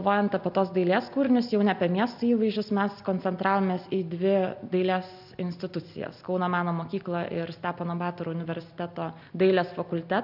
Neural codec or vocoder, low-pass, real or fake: none; 5.4 kHz; real